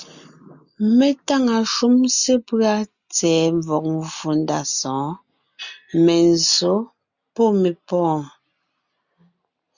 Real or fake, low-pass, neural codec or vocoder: real; 7.2 kHz; none